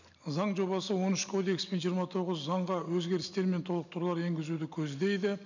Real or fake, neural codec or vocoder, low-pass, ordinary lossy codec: real; none; 7.2 kHz; none